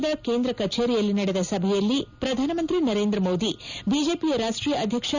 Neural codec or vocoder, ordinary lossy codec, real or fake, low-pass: none; none; real; 7.2 kHz